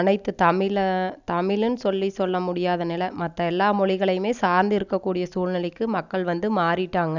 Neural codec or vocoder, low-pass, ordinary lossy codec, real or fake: none; 7.2 kHz; none; real